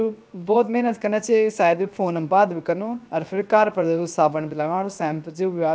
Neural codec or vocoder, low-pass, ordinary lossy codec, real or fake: codec, 16 kHz, 0.7 kbps, FocalCodec; none; none; fake